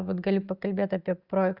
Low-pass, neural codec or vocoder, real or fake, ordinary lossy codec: 5.4 kHz; none; real; Opus, 64 kbps